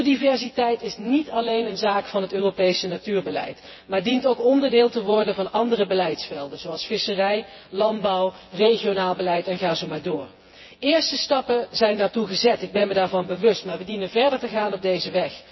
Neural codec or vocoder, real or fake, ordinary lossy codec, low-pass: vocoder, 24 kHz, 100 mel bands, Vocos; fake; MP3, 24 kbps; 7.2 kHz